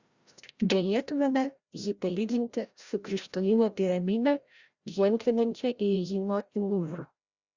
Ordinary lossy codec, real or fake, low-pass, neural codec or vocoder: Opus, 64 kbps; fake; 7.2 kHz; codec, 16 kHz, 0.5 kbps, FreqCodec, larger model